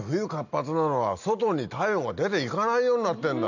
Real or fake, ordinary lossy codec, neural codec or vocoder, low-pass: real; none; none; 7.2 kHz